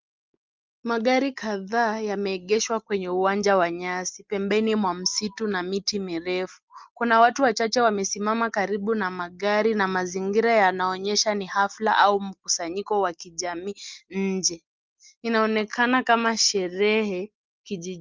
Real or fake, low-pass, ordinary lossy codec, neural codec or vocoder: real; 7.2 kHz; Opus, 32 kbps; none